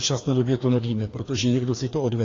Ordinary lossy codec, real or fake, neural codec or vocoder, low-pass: AAC, 32 kbps; fake; codec, 16 kHz, 2 kbps, FreqCodec, larger model; 7.2 kHz